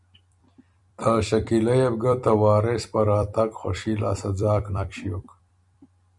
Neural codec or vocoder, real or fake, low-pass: vocoder, 44.1 kHz, 128 mel bands every 256 samples, BigVGAN v2; fake; 10.8 kHz